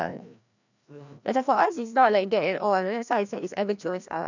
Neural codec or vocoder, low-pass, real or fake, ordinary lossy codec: codec, 16 kHz, 1 kbps, FreqCodec, larger model; 7.2 kHz; fake; none